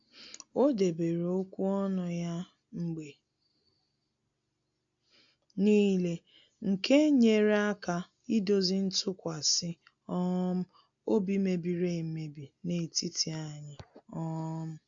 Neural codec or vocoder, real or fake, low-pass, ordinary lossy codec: none; real; 7.2 kHz; AAC, 64 kbps